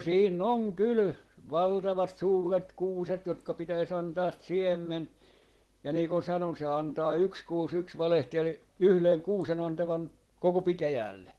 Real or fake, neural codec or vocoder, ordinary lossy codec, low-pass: fake; vocoder, 44.1 kHz, 128 mel bands, Pupu-Vocoder; Opus, 16 kbps; 19.8 kHz